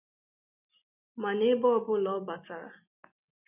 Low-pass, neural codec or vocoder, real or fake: 3.6 kHz; none; real